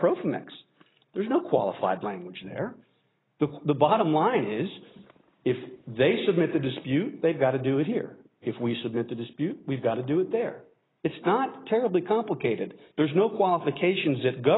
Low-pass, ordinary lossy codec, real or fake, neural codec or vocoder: 7.2 kHz; AAC, 16 kbps; real; none